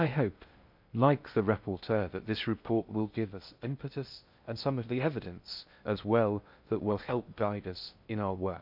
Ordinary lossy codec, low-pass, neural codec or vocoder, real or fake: none; 5.4 kHz; codec, 16 kHz in and 24 kHz out, 0.6 kbps, FocalCodec, streaming, 2048 codes; fake